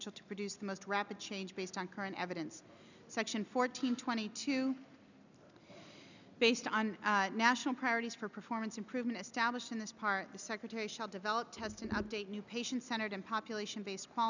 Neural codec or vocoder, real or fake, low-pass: none; real; 7.2 kHz